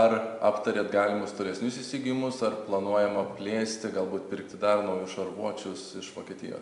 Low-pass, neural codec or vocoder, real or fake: 10.8 kHz; none; real